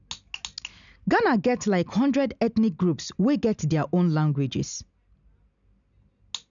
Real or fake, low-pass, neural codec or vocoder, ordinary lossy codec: real; 7.2 kHz; none; none